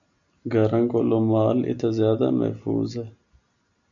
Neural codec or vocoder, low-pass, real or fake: none; 7.2 kHz; real